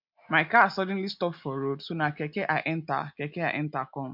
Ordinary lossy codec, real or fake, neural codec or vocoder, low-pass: MP3, 48 kbps; real; none; 5.4 kHz